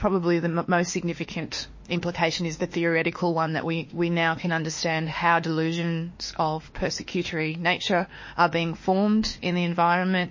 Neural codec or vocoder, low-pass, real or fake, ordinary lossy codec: autoencoder, 48 kHz, 32 numbers a frame, DAC-VAE, trained on Japanese speech; 7.2 kHz; fake; MP3, 32 kbps